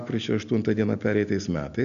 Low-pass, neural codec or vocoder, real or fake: 7.2 kHz; none; real